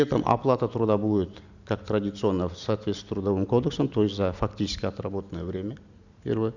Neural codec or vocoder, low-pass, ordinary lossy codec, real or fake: none; 7.2 kHz; none; real